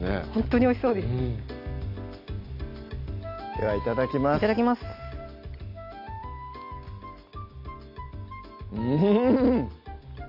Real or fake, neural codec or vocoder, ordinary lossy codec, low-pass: real; none; none; 5.4 kHz